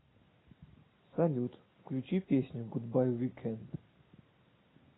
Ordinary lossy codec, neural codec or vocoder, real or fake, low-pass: AAC, 16 kbps; none; real; 7.2 kHz